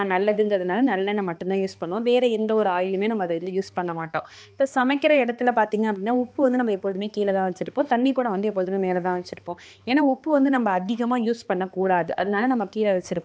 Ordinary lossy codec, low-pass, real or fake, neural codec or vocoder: none; none; fake; codec, 16 kHz, 2 kbps, X-Codec, HuBERT features, trained on balanced general audio